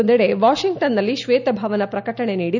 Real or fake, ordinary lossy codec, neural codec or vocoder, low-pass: real; none; none; 7.2 kHz